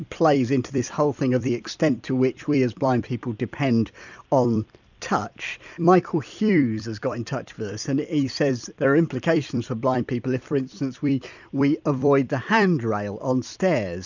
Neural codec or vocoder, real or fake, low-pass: vocoder, 44.1 kHz, 128 mel bands every 256 samples, BigVGAN v2; fake; 7.2 kHz